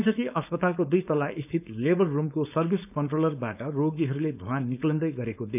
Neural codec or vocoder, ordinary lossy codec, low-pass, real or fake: codec, 16 kHz, 4.8 kbps, FACodec; none; 3.6 kHz; fake